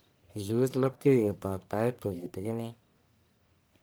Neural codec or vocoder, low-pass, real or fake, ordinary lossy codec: codec, 44.1 kHz, 1.7 kbps, Pupu-Codec; none; fake; none